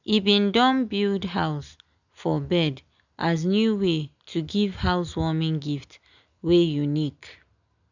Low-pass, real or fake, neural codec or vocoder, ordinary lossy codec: 7.2 kHz; real; none; none